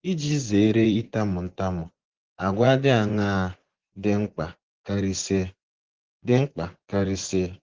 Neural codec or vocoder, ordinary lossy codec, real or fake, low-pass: vocoder, 24 kHz, 100 mel bands, Vocos; Opus, 16 kbps; fake; 7.2 kHz